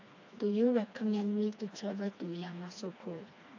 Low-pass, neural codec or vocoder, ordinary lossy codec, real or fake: 7.2 kHz; codec, 16 kHz, 2 kbps, FreqCodec, smaller model; AAC, 48 kbps; fake